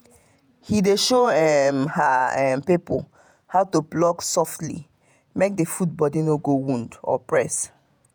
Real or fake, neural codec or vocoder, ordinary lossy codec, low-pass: fake; vocoder, 48 kHz, 128 mel bands, Vocos; none; none